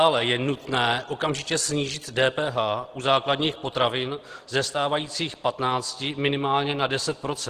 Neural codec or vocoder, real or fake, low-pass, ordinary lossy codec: none; real; 14.4 kHz; Opus, 16 kbps